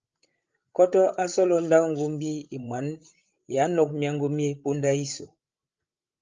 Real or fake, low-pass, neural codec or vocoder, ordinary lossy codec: fake; 7.2 kHz; codec, 16 kHz, 16 kbps, FreqCodec, larger model; Opus, 24 kbps